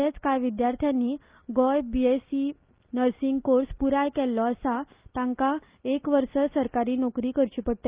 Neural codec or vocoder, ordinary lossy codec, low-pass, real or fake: none; Opus, 32 kbps; 3.6 kHz; real